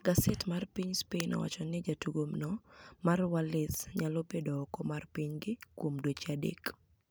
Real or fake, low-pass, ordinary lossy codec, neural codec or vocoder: fake; none; none; vocoder, 44.1 kHz, 128 mel bands every 256 samples, BigVGAN v2